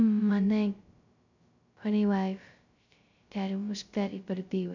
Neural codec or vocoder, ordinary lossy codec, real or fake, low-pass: codec, 16 kHz, 0.2 kbps, FocalCodec; none; fake; 7.2 kHz